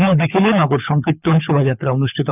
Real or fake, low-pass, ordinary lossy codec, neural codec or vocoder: fake; 3.6 kHz; none; codec, 24 kHz, 6 kbps, HILCodec